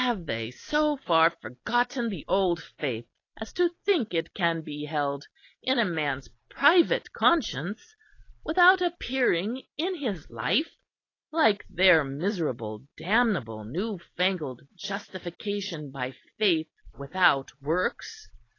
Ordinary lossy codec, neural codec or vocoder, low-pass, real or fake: AAC, 32 kbps; none; 7.2 kHz; real